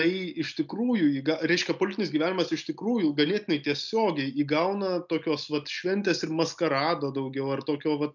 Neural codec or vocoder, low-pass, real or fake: none; 7.2 kHz; real